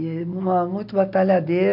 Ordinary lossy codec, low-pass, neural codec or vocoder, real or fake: MP3, 48 kbps; 5.4 kHz; none; real